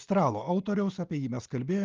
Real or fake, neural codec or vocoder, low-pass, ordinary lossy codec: real; none; 7.2 kHz; Opus, 24 kbps